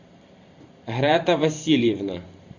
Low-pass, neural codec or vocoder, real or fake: 7.2 kHz; vocoder, 44.1 kHz, 128 mel bands every 512 samples, BigVGAN v2; fake